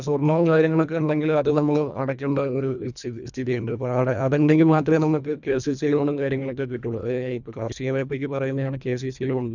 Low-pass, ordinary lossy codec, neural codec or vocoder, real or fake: 7.2 kHz; none; codec, 24 kHz, 1.5 kbps, HILCodec; fake